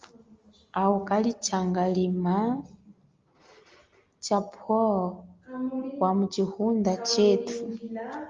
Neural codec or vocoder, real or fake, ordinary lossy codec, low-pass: none; real; Opus, 32 kbps; 7.2 kHz